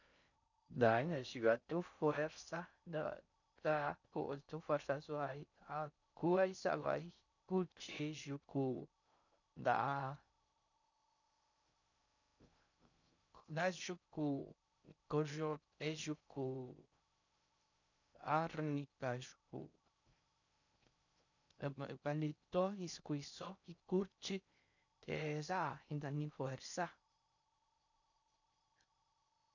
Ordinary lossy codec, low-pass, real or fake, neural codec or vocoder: none; 7.2 kHz; fake; codec, 16 kHz in and 24 kHz out, 0.6 kbps, FocalCodec, streaming, 4096 codes